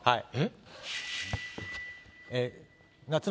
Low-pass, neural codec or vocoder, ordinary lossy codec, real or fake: none; none; none; real